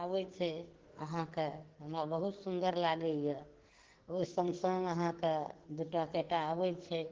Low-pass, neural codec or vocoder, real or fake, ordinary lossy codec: 7.2 kHz; codec, 44.1 kHz, 2.6 kbps, SNAC; fake; Opus, 16 kbps